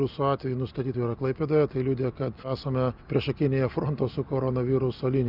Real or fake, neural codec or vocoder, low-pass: real; none; 5.4 kHz